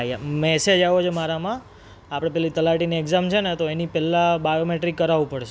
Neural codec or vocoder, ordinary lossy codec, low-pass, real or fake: none; none; none; real